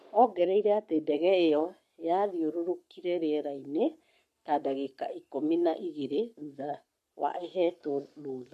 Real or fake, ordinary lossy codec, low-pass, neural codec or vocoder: fake; MP3, 64 kbps; 14.4 kHz; codec, 44.1 kHz, 7.8 kbps, Pupu-Codec